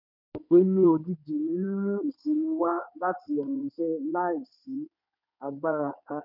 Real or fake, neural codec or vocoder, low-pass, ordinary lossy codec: fake; codec, 16 kHz in and 24 kHz out, 2.2 kbps, FireRedTTS-2 codec; 5.4 kHz; none